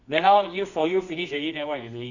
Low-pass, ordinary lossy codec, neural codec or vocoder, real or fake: 7.2 kHz; Opus, 64 kbps; codec, 24 kHz, 0.9 kbps, WavTokenizer, medium music audio release; fake